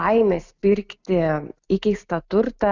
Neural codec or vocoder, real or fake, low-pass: none; real; 7.2 kHz